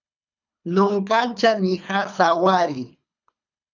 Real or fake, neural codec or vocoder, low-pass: fake; codec, 24 kHz, 3 kbps, HILCodec; 7.2 kHz